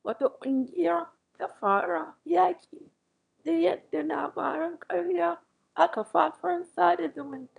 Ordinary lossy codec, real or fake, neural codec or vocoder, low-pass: none; fake; autoencoder, 22.05 kHz, a latent of 192 numbers a frame, VITS, trained on one speaker; 9.9 kHz